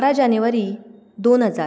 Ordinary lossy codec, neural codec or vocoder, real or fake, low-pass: none; none; real; none